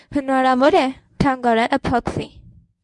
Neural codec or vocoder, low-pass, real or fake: codec, 24 kHz, 0.9 kbps, WavTokenizer, medium speech release version 1; 10.8 kHz; fake